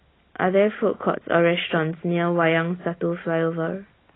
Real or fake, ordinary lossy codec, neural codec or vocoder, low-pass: real; AAC, 16 kbps; none; 7.2 kHz